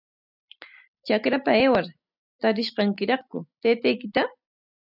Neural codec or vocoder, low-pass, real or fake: none; 5.4 kHz; real